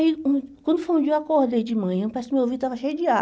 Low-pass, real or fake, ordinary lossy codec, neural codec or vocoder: none; real; none; none